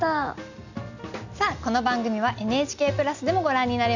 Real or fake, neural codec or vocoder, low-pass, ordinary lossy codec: real; none; 7.2 kHz; none